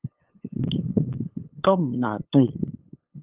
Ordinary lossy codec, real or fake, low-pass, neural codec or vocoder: Opus, 24 kbps; fake; 3.6 kHz; codec, 16 kHz, 8 kbps, FunCodec, trained on LibriTTS, 25 frames a second